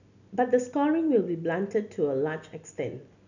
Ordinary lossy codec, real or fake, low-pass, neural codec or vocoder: none; real; 7.2 kHz; none